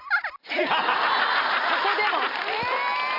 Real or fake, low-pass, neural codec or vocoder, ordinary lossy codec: real; 5.4 kHz; none; none